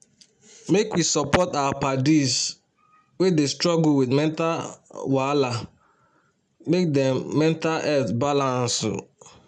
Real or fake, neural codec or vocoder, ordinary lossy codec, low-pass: real; none; none; 10.8 kHz